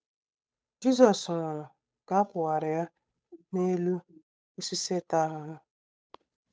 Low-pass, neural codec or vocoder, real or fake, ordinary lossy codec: none; codec, 16 kHz, 8 kbps, FunCodec, trained on Chinese and English, 25 frames a second; fake; none